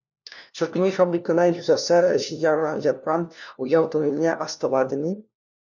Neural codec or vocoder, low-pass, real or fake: codec, 16 kHz, 1 kbps, FunCodec, trained on LibriTTS, 50 frames a second; 7.2 kHz; fake